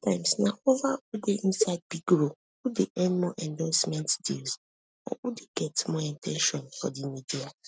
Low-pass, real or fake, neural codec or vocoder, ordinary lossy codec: none; real; none; none